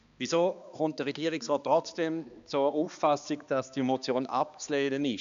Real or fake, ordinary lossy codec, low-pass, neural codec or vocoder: fake; AAC, 96 kbps; 7.2 kHz; codec, 16 kHz, 2 kbps, X-Codec, HuBERT features, trained on balanced general audio